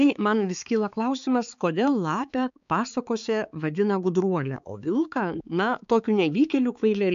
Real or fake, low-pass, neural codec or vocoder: fake; 7.2 kHz; codec, 16 kHz, 4 kbps, X-Codec, HuBERT features, trained on balanced general audio